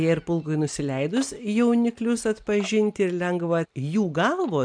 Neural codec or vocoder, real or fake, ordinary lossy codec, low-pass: none; real; MP3, 64 kbps; 9.9 kHz